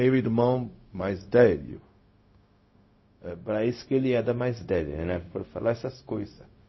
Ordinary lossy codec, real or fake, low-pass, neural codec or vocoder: MP3, 24 kbps; fake; 7.2 kHz; codec, 16 kHz, 0.4 kbps, LongCat-Audio-Codec